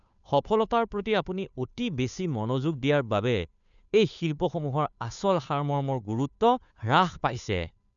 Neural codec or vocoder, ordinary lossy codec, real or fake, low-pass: codec, 16 kHz, 2 kbps, FunCodec, trained on Chinese and English, 25 frames a second; none; fake; 7.2 kHz